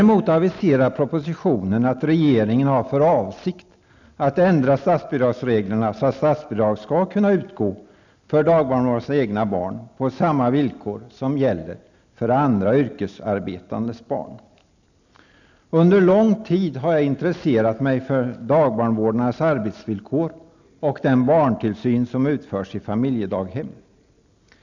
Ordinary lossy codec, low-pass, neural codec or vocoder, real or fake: none; 7.2 kHz; none; real